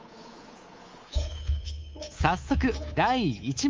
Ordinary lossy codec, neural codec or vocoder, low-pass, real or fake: Opus, 32 kbps; codec, 24 kHz, 3.1 kbps, DualCodec; 7.2 kHz; fake